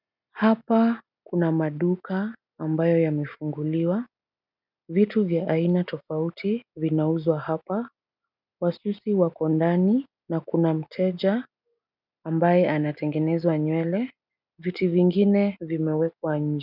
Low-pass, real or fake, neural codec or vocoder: 5.4 kHz; real; none